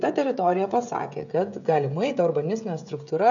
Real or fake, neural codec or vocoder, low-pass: fake; codec, 16 kHz, 16 kbps, FreqCodec, smaller model; 7.2 kHz